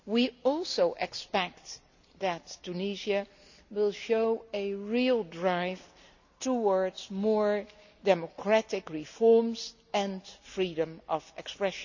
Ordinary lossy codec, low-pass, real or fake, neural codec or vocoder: none; 7.2 kHz; real; none